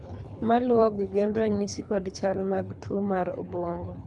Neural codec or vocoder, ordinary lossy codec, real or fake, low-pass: codec, 24 kHz, 3 kbps, HILCodec; none; fake; 10.8 kHz